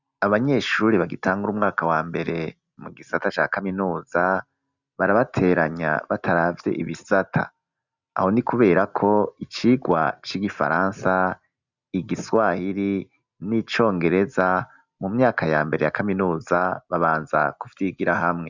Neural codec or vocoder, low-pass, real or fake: none; 7.2 kHz; real